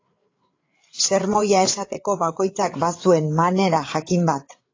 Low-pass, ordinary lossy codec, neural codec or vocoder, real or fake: 7.2 kHz; AAC, 32 kbps; codec, 16 kHz, 8 kbps, FreqCodec, larger model; fake